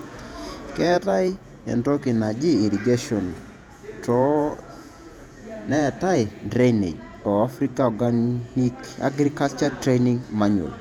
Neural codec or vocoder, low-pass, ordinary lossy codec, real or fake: vocoder, 48 kHz, 128 mel bands, Vocos; 19.8 kHz; none; fake